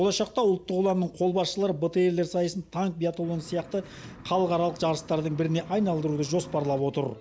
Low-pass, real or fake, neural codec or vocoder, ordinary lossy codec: none; real; none; none